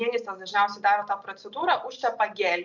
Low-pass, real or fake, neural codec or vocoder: 7.2 kHz; real; none